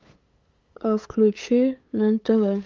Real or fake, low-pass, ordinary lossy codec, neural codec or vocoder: fake; 7.2 kHz; Opus, 32 kbps; codec, 16 kHz, 8 kbps, FunCodec, trained on LibriTTS, 25 frames a second